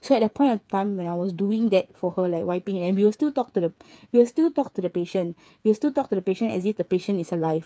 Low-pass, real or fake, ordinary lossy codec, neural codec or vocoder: none; fake; none; codec, 16 kHz, 8 kbps, FreqCodec, smaller model